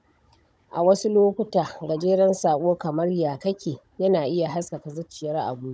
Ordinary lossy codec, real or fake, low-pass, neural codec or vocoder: none; fake; none; codec, 16 kHz, 16 kbps, FunCodec, trained on Chinese and English, 50 frames a second